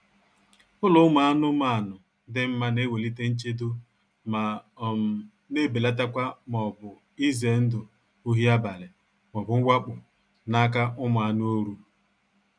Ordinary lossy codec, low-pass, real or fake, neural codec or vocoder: none; 9.9 kHz; real; none